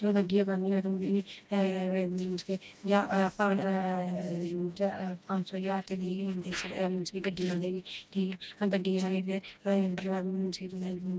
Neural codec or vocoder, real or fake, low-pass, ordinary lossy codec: codec, 16 kHz, 1 kbps, FreqCodec, smaller model; fake; none; none